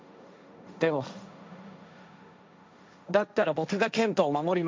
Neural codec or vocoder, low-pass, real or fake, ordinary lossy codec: codec, 16 kHz, 1.1 kbps, Voila-Tokenizer; none; fake; none